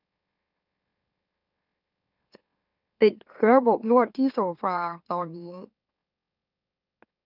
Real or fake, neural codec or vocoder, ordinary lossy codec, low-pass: fake; autoencoder, 44.1 kHz, a latent of 192 numbers a frame, MeloTTS; none; 5.4 kHz